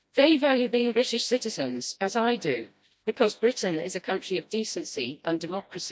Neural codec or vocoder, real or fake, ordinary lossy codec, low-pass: codec, 16 kHz, 1 kbps, FreqCodec, smaller model; fake; none; none